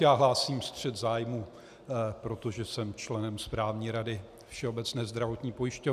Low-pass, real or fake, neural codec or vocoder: 14.4 kHz; real; none